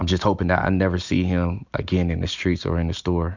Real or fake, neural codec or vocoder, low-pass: real; none; 7.2 kHz